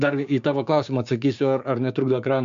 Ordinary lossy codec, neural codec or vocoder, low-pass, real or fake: MP3, 64 kbps; codec, 16 kHz, 6 kbps, DAC; 7.2 kHz; fake